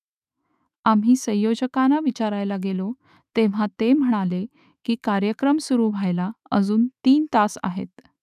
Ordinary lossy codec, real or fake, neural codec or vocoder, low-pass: none; fake; autoencoder, 48 kHz, 128 numbers a frame, DAC-VAE, trained on Japanese speech; 14.4 kHz